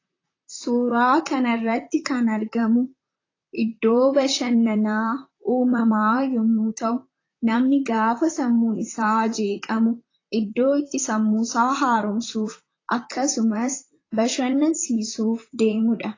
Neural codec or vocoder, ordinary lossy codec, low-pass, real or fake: vocoder, 44.1 kHz, 128 mel bands, Pupu-Vocoder; AAC, 32 kbps; 7.2 kHz; fake